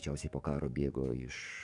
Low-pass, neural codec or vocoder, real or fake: 10.8 kHz; codec, 44.1 kHz, 7.8 kbps, DAC; fake